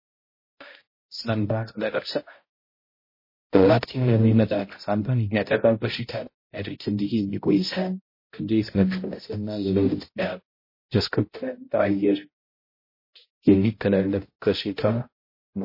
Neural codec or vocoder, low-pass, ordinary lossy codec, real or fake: codec, 16 kHz, 0.5 kbps, X-Codec, HuBERT features, trained on balanced general audio; 5.4 kHz; MP3, 24 kbps; fake